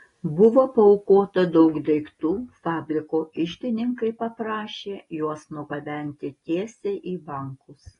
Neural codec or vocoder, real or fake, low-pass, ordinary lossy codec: none; real; 10.8 kHz; AAC, 32 kbps